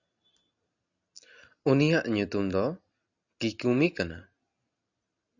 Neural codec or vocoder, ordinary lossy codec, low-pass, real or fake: none; Opus, 64 kbps; 7.2 kHz; real